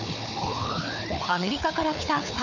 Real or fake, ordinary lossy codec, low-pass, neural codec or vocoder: fake; none; 7.2 kHz; codec, 16 kHz, 4 kbps, FunCodec, trained on Chinese and English, 50 frames a second